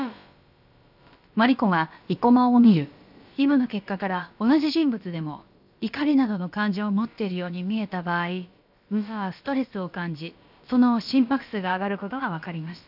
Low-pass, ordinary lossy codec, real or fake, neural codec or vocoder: 5.4 kHz; none; fake; codec, 16 kHz, about 1 kbps, DyCAST, with the encoder's durations